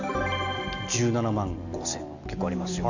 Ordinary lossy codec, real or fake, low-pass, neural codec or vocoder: none; real; 7.2 kHz; none